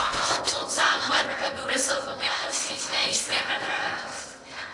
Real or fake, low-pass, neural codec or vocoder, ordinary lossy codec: fake; 10.8 kHz; codec, 16 kHz in and 24 kHz out, 0.6 kbps, FocalCodec, streaming, 4096 codes; AAC, 48 kbps